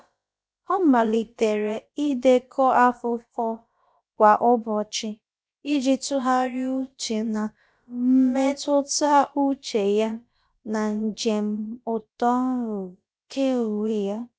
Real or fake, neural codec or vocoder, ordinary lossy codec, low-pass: fake; codec, 16 kHz, about 1 kbps, DyCAST, with the encoder's durations; none; none